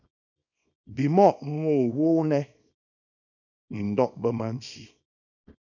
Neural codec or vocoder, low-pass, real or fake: codec, 24 kHz, 0.9 kbps, WavTokenizer, small release; 7.2 kHz; fake